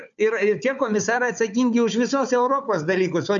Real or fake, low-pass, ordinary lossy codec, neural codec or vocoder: fake; 7.2 kHz; MP3, 96 kbps; codec, 16 kHz, 4 kbps, FunCodec, trained on Chinese and English, 50 frames a second